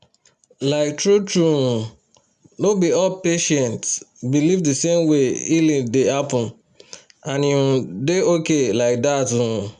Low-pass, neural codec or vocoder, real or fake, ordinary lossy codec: 10.8 kHz; none; real; none